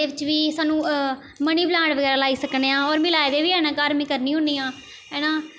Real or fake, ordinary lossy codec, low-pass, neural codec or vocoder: real; none; none; none